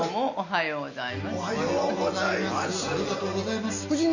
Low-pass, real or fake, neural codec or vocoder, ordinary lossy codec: 7.2 kHz; real; none; none